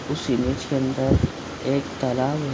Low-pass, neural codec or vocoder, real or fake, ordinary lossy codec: none; none; real; none